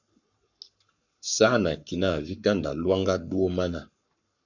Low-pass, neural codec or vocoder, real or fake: 7.2 kHz; codec, 44.1 kHz, 7.8 kbps, Pupu-Codec; fake